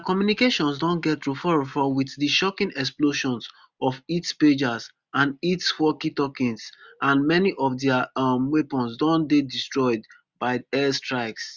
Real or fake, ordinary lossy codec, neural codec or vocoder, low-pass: real; Opus, 64 kbps; none; 7.2 kHz